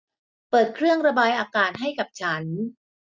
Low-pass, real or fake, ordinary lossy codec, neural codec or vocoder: none; real; none; none